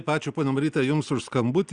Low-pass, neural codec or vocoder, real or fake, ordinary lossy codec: 9.9 kHz; none; real; Opus, 64 kbps